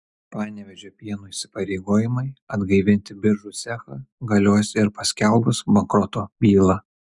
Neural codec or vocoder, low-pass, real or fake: none; 10.8 kHz; real